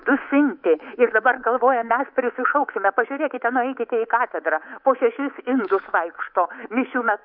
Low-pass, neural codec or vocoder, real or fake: 5.4 kHz; codec, 24 kHz, 3.1 kbps, DualCodec; fake